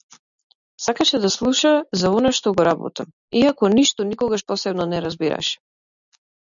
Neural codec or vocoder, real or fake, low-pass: none; real; 7.2 kHz